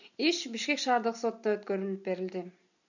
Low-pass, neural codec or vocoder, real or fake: 7.2 kHz; none; real